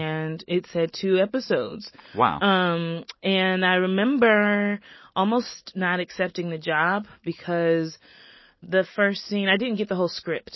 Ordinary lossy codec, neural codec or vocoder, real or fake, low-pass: MP3, 24 kbps; none; real; 7.2 kHz